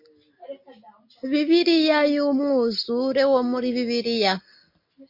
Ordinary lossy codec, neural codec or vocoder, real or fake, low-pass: MP3, 32 kbps; none; real; 5.4 kHz